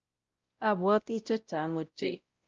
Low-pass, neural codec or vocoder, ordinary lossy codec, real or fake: 7.2 kHz; codec, 16 kHz, 0.5 kbps, X-Codec, WavLM features, trained on Multilingual LibriSpeech; Opus, 24 kbps; fake